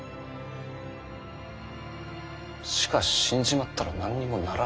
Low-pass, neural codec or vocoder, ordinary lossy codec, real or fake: none; none; none; real